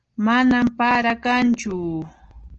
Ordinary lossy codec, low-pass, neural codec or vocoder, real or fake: Opus, 16 kbps; 7.2 kHz; none; real